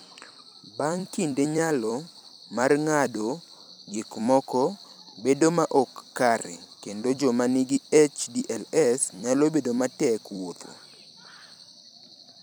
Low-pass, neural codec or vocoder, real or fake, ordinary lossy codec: none; vocoder, 44.1 kHz, 128 mel bands every 256 samples, BigVGAN v2; fake; none